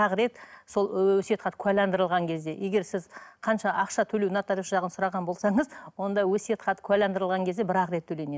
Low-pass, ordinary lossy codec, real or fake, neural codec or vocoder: none; none; real; none